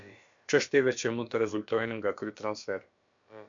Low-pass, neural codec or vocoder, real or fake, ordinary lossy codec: 7.2 kHz; codec, 16 kHz, about 1 kbps, DyCAST, with the encoder's durations; fake; MP3, 64 kbps